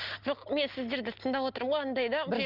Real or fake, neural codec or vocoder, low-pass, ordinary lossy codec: real; none; 5.4 kHz; Opus, 32 kbps